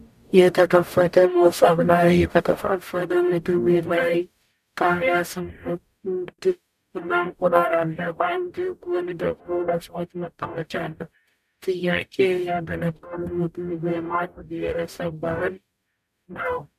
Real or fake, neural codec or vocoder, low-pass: fake; codec, 44.1 kHz, 0.9 kbps, DAC; 14.4 kHz